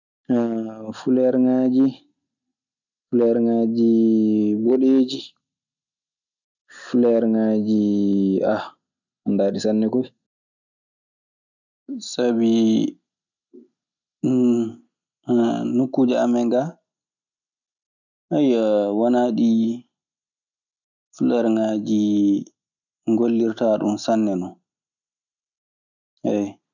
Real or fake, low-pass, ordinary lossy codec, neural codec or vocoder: real; 7.2 kHz; none; none